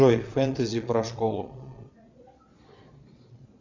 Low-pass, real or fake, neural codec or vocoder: 7.2 kHz; fake; vocoder, 22.05 kHz, 80 mel bands, Vocos